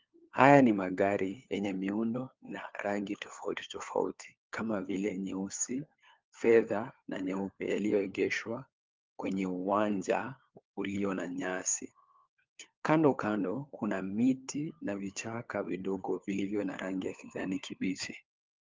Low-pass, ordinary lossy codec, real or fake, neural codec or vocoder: 7.2 kHz; Opus, 32 kbps; fake; codec, 16 kHz, 4 kbps, FunCodec, trained on LibriTTS, 50 frames a second